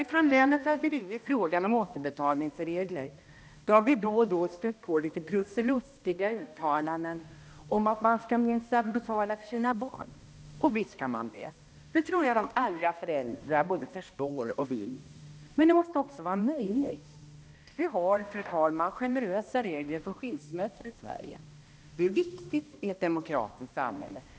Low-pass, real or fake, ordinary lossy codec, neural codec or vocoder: none; fake; none; codec, 16 kHz, 1 kbps, X-Codec, HuBERT features, trained on balanced general audio